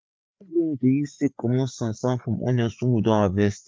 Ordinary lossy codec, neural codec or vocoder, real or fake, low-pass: none; codec, 16 kHz, 4 kbps, FreqCodec, larger model; fake; none